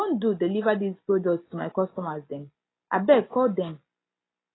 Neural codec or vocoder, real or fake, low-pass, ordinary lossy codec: none; real; 7.2 kHz; AAC, 16 kbps